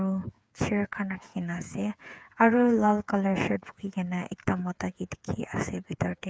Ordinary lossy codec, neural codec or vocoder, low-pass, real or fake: none; codec, 16 kHz, 8 kbps, FreqCodec, smaller model; none; fake